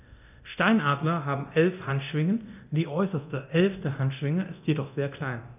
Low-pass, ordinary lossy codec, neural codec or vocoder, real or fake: 3.6 kHz; none; codec, 24 kHz, 0.9 kbps, DualCodec; fake